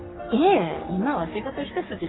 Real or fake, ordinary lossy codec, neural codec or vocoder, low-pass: fake; AAC, 16 kbps; codec, 44.1 kHz, 3.4 kbps, Pupu-Codec; 7.2 kHz